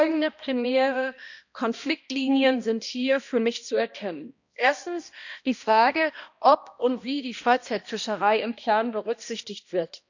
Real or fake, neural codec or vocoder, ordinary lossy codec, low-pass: fake; codec, 16 kHz, 1 kbps, X-Codec, HuBERT features, trained on balanced general audio; none; 7.2 kHz